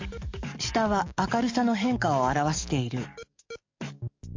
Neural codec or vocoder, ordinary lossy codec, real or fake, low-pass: codec, 44.1 kHz, 7.8 kbps, DAC; MP3, 48 kbps; fake; 7.2 kHz